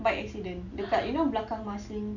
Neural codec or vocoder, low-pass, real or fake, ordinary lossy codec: none; 7.2 kHz; real; none